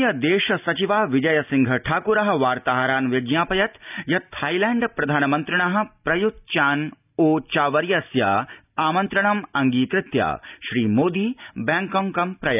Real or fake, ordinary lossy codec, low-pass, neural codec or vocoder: real; none; 3.6 kHz; none